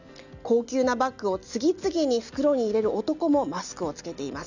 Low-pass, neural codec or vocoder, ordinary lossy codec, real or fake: 7.2 kHz; none; none; real